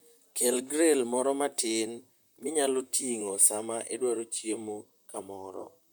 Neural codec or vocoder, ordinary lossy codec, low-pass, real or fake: vocoder, 44.1 kHz, 128 mel bands, Pupu-Vocoder; none; none; fake